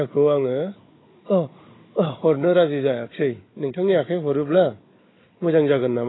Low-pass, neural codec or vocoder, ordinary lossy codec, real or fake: 7.2 kHz; codec, 16 kHz, 16 kbps, FunCodec, trained on Chinese and English, 50 frames a second; AAC, 16 kbps; fake